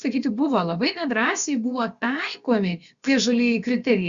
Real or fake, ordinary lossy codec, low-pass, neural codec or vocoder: fake; Opus, 64 kbps; 7.2 kHz; codec, 16 kHz, about 1 kbps, DyCAST, with the encoder's durations